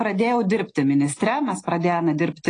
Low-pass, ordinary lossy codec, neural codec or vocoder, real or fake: 9.9 kHz; AAC, 32 kbps; none; real